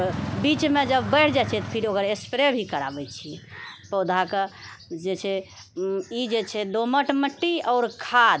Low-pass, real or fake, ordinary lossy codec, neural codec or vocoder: none; real; none; none